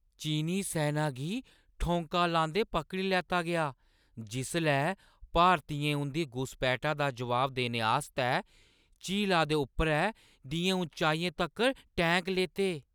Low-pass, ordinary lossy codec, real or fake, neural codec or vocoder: none; none; real; none